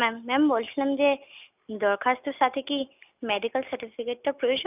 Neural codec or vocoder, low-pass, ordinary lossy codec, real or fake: none; 3.6 kHz; none; real